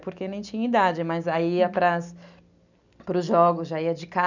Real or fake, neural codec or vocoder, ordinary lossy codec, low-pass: real; none; none; 7.2 kHz